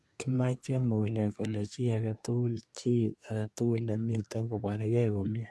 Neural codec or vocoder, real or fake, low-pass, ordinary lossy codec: codec, 24 kHz, 1 kbps, SNAC; fake; none; none